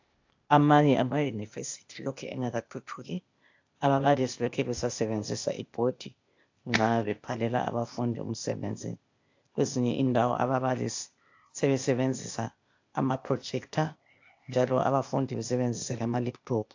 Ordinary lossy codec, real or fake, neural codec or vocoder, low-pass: AAC, 48 kbps; fake; codec, 16 kHz, 0.8 kbps, ZipCodec; 7.2 kHz